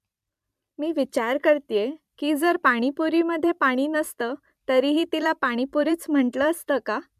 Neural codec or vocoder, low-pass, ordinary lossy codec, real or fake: vocoder, 44.1 kHz, 128 mel bands every 256 samples, BigVGAN v2; 14.4 kHz; MP3, 96 kbps; fake